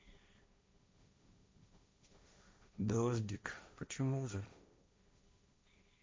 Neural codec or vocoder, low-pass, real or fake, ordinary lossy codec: codec, 16 kHz, 1.1 kbps, Voila-Tokenizer; none; fake; none